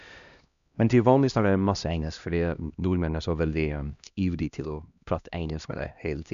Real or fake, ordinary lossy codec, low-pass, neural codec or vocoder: fake; none; 7.2 kHz; codec, 16 kHz, 1 kbps, X-Codec, HuBERT features, trained on LibriSpeech